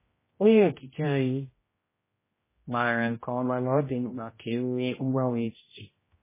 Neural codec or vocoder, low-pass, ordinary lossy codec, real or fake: codec, 16 kHz, 0.5 kbps, X-Codec, HuBERT features, trained on general audio; 3.6 kHz; MP3, 16 kbps; fake